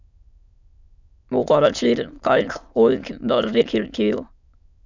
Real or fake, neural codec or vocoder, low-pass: fake; autoencoder, 22.05 kHz, a latent of 192 numbers a frame, VITS, trained on many speakers; 7.2 kHz